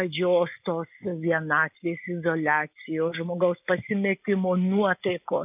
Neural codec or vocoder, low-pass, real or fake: none; 3.6 kHz; real